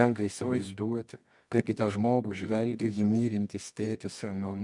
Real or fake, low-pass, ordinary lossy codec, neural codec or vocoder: fake; 10.8 kHz; MP3, 96 kbps; codec, 24 kHz, 0.9 kbps, WavTokenizer, medium music audio release